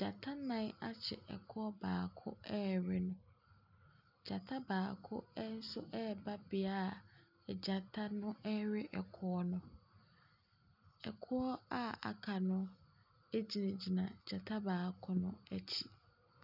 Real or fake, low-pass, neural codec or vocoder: real; 5.4 kHz; none